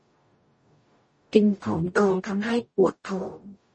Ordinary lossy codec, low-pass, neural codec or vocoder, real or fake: MP3, 32 kbps; 9.9 kHz; codec, 44.1 kHz, 0.9 kbps, DAC; fake